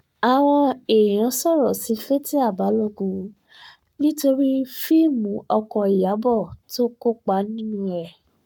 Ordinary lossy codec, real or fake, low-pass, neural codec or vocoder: none; fake; 19.8 kHz; codec, 44.1 kHz, 7.8 kbps, Pupu-Codec